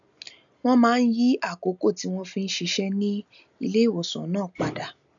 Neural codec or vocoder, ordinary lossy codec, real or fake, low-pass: none; none; real; 7.2 kHz